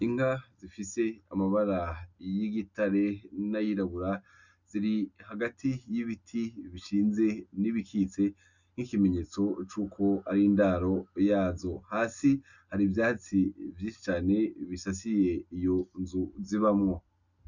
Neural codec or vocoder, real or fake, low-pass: none; real; 7.2 kHz